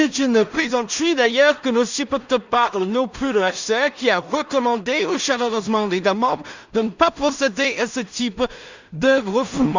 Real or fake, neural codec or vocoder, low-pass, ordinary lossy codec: fake; codec, 16 kHz in and 24 kHz out, 0.4 kbps, LongCat-Audio-Codec, two codebook decoder; 7.2 kHz; Opus, 64 kbps